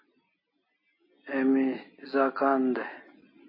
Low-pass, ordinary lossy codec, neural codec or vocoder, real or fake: 5.4 kHz; MP3, 24 kbps; none; real